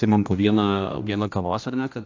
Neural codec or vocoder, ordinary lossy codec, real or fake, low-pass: codec, 16 kHz, 1 kbps, X-Codec, HuBERT features, trained on general audio; AAC, 48 kbps; fake; 7.2 kHz